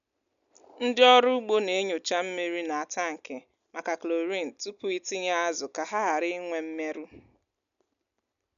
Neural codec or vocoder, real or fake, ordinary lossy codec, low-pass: none; real; none; 7.2 kHz